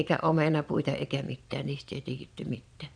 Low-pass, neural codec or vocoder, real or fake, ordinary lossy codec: 9.9 kHz; vocoder, 22.05 kHz, 80 mel bands, Vocos; fake; none